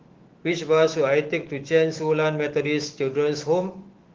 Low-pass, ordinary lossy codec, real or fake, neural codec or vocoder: 7.2 kHz; Opus, 16 kbps; fake; autoencoder, 48 kHz, 128 numbers a frame, DAC-VAE, trained on Japanese speech